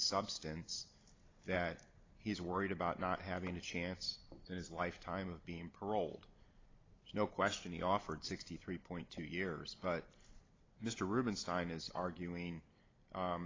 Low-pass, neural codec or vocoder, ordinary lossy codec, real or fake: 7.2 kHz; none; AAC, 32 kbps; real